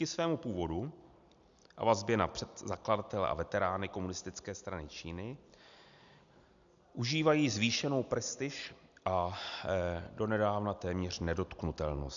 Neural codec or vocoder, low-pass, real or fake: none; 7.2 kHz; real